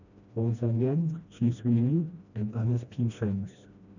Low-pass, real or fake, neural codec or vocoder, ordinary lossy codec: 7.2 kHz; fake; codec, 16 kHz, 1 kbps, FreqCodec, smaller model; none